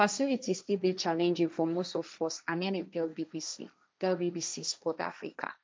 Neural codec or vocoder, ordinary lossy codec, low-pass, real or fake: codec, 16 kHz, 1.1 kbps, Voila-Tokenizer; none; none; fake